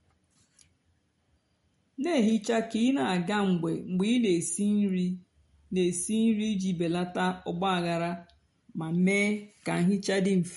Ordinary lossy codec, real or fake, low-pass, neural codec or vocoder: MP3, 48 kbps; real; 19.8 kHz; none